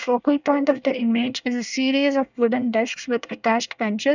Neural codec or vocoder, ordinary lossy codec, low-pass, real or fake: codec, 24 kHz, 1 kbps, SNAC; none; 7.2 kHz; fake